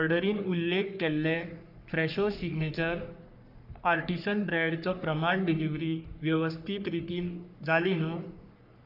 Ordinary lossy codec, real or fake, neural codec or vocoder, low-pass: none; fake; codec, 44.1 kHz, 3.4 kbps, Pupu-Codec; 5.4 kHz